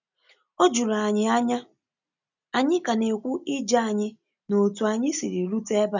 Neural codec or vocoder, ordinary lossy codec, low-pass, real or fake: none; none; 7.2 kHz; real